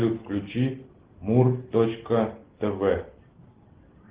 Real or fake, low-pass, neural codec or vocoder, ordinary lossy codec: real; 3.6 kHz; none; Opus, 16 kbps